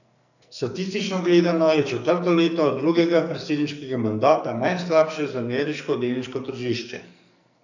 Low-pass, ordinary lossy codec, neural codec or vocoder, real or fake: 7.2 kHz; none; codec, 44.1 kHz, 2.6 kbps, SNAC; fake